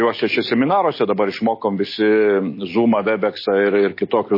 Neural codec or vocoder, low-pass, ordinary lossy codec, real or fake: none; 5.4 kHz; MP3, 24 kbps; real